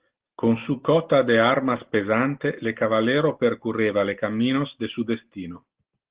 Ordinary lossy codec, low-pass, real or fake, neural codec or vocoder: Opus, 16 kbps; 3.6 kHz; real; none